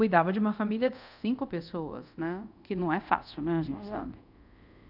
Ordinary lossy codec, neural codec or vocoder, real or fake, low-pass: none; codec, 24 kHz, 0.5 kbps, DualCodec; fake; 5.4 kHz